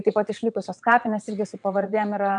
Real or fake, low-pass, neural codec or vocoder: fake; 9.9 kHz; vocoder, 22.05 kHz, 80 mel bands, WaveNeXt